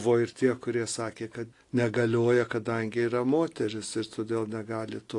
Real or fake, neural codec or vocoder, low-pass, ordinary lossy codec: real; none; 10.8 kHz; AAC, 48 kbps